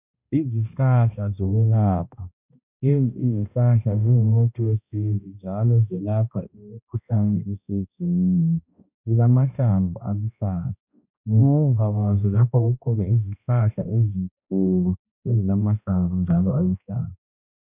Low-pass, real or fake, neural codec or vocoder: 3.6 kHz; fake; codec, 16 kHz, 1 kbps, X-Codec, HuBERT features, trained on balanced general audio